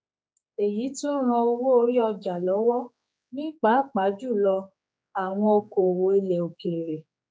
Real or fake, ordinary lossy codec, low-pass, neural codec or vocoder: fake; none; none; codec, 16 kHz, 4 kbps, X-Codec, HuBERT features, trained on general audio